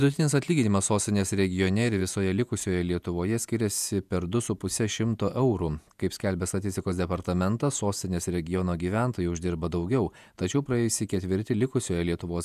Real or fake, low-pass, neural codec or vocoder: fake; 14.4 kHz; vocoder, 48 kHz, 128 mel bands, Vocos